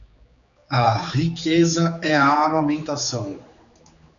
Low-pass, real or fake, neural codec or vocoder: 7.2 kHz; fake; codec, 16 kHz, 4 kbps, X-Codec, HuBERT features, trained on general audio